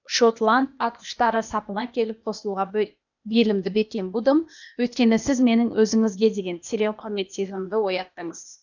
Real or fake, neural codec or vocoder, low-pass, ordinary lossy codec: fake; codec, 16 kHz, 0.8 kbps, ZipCodec; 7.2 kHz; none